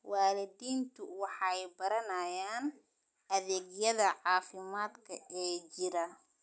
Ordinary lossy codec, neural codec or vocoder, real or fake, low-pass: none; none; real; none